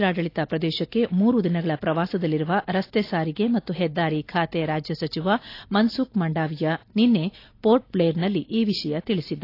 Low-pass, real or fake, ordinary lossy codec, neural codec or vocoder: 5.4 kHz; real; AAC, 32 kbps; none